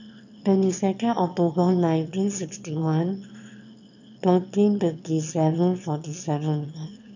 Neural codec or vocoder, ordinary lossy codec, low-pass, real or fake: autoencoder, 22.05 kHz, a latent of 192 numbers a frame, VITS, trained on one speaker; none; 7.2 kHz; fake